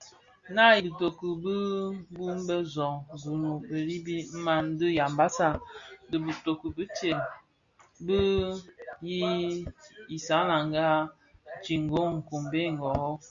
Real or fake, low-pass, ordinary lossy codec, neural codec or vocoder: real; 7.2 kHz; Opus, 64 kbps; none